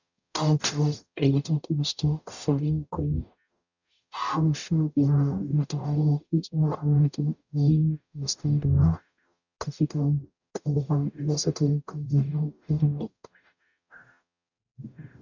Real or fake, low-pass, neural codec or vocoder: fake; 7.2 kHz; codec, 44.1 kHz, 0.9 kbps, DAC